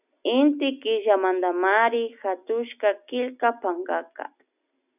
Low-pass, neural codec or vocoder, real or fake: 3.6 kHz; none; real